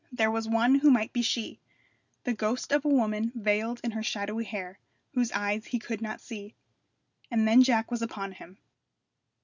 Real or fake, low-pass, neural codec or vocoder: real; 7.2 kHz; none